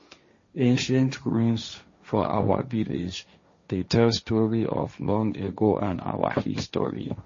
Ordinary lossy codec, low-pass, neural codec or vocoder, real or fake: MP3, 32 kbps; 7.2 kHz; codec, 16 kHz, 1.1 kbps, Voila-Tokenizer; fake